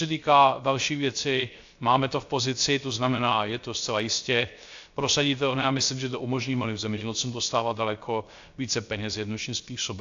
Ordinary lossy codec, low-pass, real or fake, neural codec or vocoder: AAC, 64 kbps; 7.2 kHz; fake; codec, 16 kHz, 0.3 kbps, FocalCodec